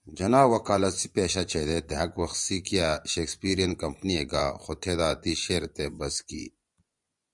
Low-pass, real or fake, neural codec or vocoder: 10.8 kHz; fake; vocoder, 24 kHz, 100 mel bands, Vocos